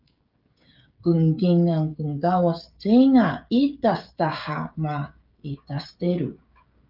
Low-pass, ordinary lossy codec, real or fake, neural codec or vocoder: 5.4 kHz; Opus, 24 kbps; fake; codec, 16 kHz, 16 kbps, FreqCodec, smaller model